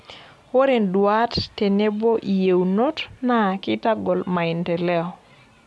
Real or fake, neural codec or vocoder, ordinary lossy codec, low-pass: real; none; none; none